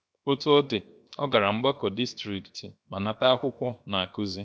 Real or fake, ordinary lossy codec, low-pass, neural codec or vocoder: fake; none; none; codec, 16 kHz, 0.7 kbps, FocalCodec